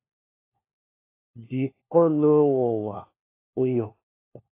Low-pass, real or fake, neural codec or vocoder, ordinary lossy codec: 3.6 kHz; fake; codec, 16 kHz, 1 kbps, FunCodec, trained on LibriTTS, 50 frames a second; AAC, 24 kbps